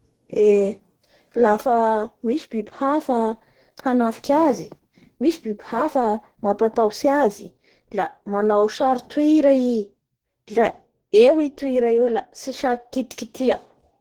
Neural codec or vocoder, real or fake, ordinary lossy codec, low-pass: codec, 44.1 kHz, 2.6 kbps, DAC; fake; Opus, 16 kbps; 19.8 kHz